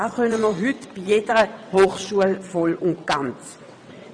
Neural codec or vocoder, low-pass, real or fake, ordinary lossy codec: vocoder, 22.05 kHz, 80 mel bands, WaveNeXt; 9.9 kHz; fake; none